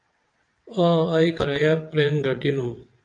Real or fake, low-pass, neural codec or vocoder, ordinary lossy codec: fake; 9.9 kHz; vocoder, 22.05 kHz, 80 mel bands, WaveNeXt; AAC, 64 kbps